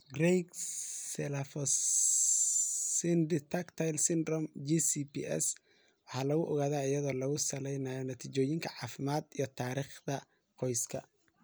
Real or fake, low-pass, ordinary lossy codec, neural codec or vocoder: real; none; none; none